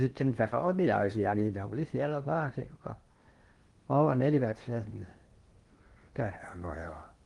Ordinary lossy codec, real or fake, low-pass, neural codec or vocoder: Opus, 24 kbps; fake; 10.8 kHz; codec, 16 kHz in and 24 kHz out, 0.8 kbps, FocalCodec, streaming, 65536 codes